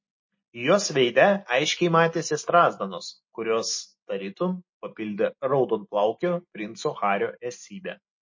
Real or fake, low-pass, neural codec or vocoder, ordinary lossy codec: real; 7.2 kHz; none; MP3, 32 kbps